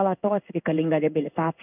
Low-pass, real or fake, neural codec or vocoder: 3.6 kHz; fake; codec, 16 kHz in and 24 kHz out, 1 kbps, XY-Tokenizer